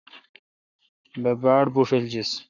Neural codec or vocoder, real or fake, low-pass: codec, 44.1 kHz, 7.8 kbps, Pupu-Codec; fake; 7.2 kHz